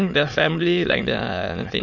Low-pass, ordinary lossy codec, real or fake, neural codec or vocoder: 7.2 kHz; none; fake; autoencoder, 22.05 kHz, a latent of 192 numbers a frame, VITS, trained on many speakers